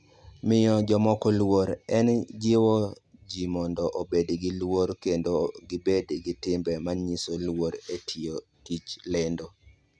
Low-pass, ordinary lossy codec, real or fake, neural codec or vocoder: none; none; real; none